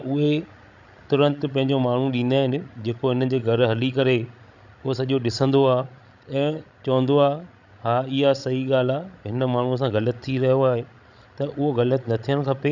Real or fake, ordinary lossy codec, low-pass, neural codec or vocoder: fake; none; 7.2 kHz; codec, 16 kHz, 16 kbps, FreqCodec, larger model